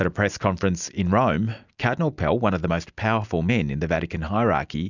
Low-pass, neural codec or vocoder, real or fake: 7.2 kHz; none; real